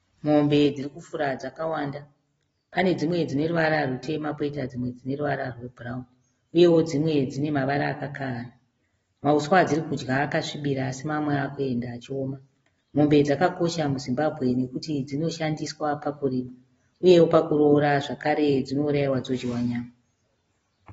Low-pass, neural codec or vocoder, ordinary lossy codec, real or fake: 19.8 kHz; none; AAC, 24 kbps; real